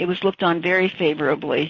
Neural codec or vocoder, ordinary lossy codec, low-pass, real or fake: none; MP3, 32 kbps; 7.2 kHz; real